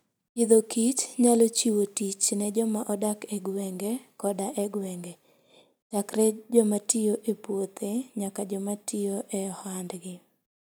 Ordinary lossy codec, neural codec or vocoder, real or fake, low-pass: none; none; real; none